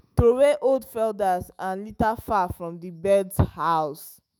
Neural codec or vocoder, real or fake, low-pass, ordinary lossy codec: autoencoder, 48 kHz, 128 numbers a frame, DAC-VAE, trained on Japanese speech; fake; none; none